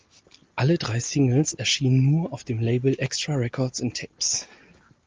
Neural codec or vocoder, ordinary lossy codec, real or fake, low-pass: none; Opus, 32 kbps; real; 7.2 kHz